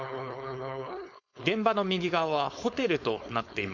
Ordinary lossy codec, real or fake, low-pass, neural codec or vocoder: none; fake; 7.2 kHz; codec, 16 kHz, 4.8 kbps, FACodec